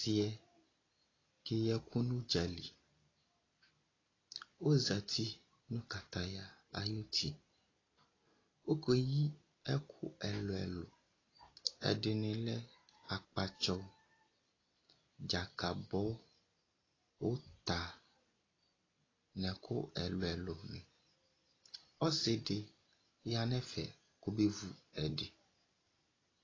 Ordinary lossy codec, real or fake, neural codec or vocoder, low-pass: AAC, 32 kbps; real; none; 7.2 kHz